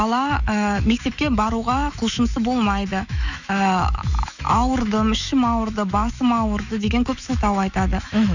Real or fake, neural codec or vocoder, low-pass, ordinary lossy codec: fake; autoencoder, 48 kHz, 128 numbers a frame, DAC-VAE, trained on Japanese speech; 7.2 kHz; none